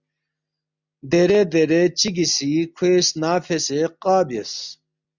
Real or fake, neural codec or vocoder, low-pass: real; none; 7.2 kHz